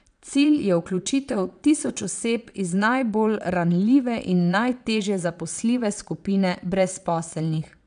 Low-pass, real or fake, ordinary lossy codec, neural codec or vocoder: 9.9 kHz; fake; none; vocoder, 22.05 kHz, 80 mel bands, Vocos